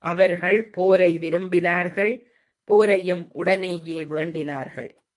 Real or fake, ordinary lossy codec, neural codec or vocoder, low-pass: fake; MP3, 64 kbps; codec, 24 kHz, 1.5 kbps, HILCodec; 10.8 kHz